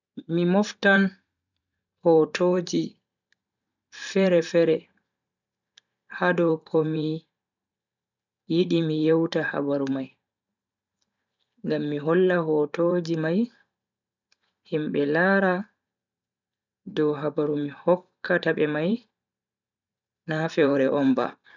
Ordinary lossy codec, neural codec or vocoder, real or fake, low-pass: none; vocoder, 44.1 kHz, 128 mel bands every 512 samples, BigVGAN v2; fake; 7.2 kHz